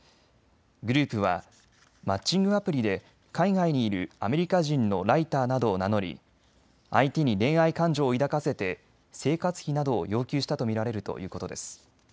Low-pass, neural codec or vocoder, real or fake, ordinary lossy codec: none; none; real; none